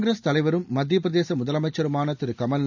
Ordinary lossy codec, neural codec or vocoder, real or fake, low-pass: none; none; real; 7.2 kHz